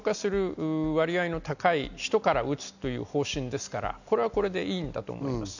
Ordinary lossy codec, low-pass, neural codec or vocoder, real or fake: none; 7.2 kHz; none; real